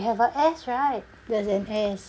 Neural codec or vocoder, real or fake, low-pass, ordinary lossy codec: none; real; none; none